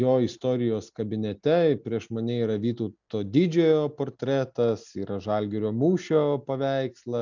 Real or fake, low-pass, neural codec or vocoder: real; 7.2 kHz; none